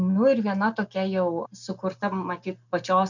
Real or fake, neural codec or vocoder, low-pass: real; none; 7.2 kHz